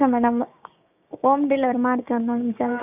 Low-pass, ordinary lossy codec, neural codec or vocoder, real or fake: 3.6 kHz; none; codec, 16 kHz, 6 kbps, DAC; fake